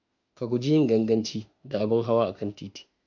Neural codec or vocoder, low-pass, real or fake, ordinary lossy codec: autoencoder, 48 kHz, 32 numbers a frame, DAC-VAE, trained on Japanese speech; 7.2 kHz; fake; AAC, 48 kbps